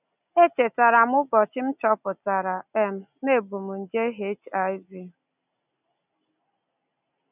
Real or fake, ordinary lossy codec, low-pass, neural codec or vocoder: real; none; 3.6 kHz; none